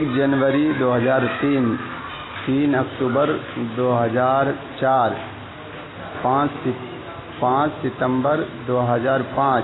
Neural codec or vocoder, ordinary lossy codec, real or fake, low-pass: none; AAC, 16 kbps; real; 7.2 kHz